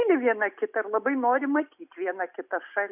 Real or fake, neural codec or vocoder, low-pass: real; none; 3.6 kHz